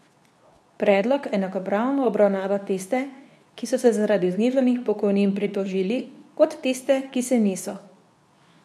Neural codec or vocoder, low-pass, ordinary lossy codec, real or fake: codec, 24 kHz, 0.9 kbps, WavTokenizer, medium speech release version 2; none; none; fake